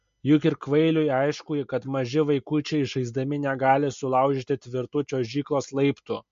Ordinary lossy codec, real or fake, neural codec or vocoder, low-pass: MP3, 48 kbps; real; none; 7.2 kHz